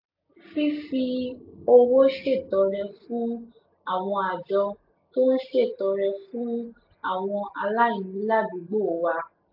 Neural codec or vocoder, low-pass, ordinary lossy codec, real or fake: none; 5.4 kHz; AAC, 48 kbps; real